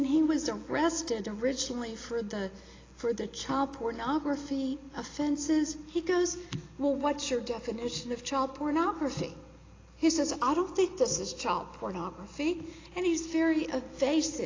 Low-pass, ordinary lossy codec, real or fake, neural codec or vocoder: 7.2 kHz; AAC, 32 kbps; real; none